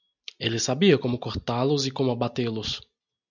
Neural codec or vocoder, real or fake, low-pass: none; real; 7.2 kHz